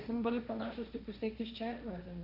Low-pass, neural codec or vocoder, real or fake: 5.4 kHz; codec, 16 kHz, 1.1 kbps, Voila-Tokenizer; fake